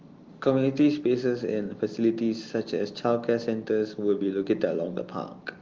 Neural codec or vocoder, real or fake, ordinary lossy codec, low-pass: none; real; Opus, 32 kbps; 7.2 kHz